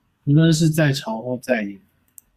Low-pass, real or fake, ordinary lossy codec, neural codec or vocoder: 14.4 kHz; fake; Opus, 64 kbps; codec, 44.1 kHz, 2.6 kbps, SNAC